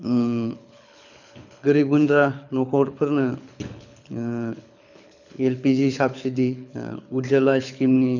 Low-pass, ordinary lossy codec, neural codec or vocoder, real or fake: 7.2 kHz; none; codec, 24 kHz, 6 kbps, HILCodec; fake